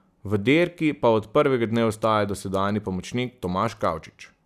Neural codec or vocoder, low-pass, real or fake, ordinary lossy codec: none; 14.4 kHz; real; none